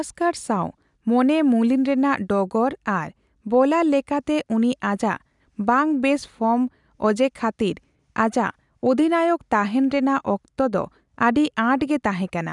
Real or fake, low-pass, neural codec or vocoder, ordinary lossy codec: real; 10.8 kHz; none; none